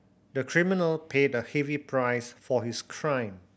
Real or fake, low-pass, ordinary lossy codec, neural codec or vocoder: real; none; none; none